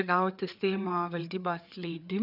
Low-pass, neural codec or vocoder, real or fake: 5.4 kHz; codec, 16 kHz, 4 kbps, FreqCodec, larger model; fake